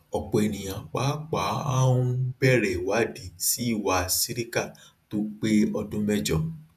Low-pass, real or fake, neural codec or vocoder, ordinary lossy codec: 14.4 kHz; fake; vocoder, 48 kHz, 128 mel bands, Vocos; none